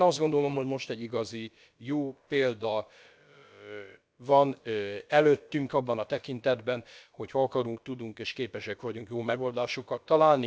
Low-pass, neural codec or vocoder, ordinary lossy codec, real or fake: none; codec, 16 kHz, about 1 kbps, DyCAST, with the encoder's durations; none; fake